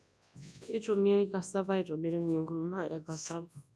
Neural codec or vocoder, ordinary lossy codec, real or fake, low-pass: codec, 24 kHz, 0.9 kbps, WavTokenizer, large speech release; none; fake; none